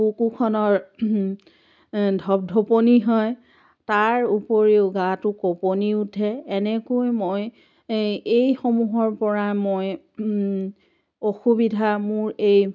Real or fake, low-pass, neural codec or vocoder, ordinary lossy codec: real; none; none; none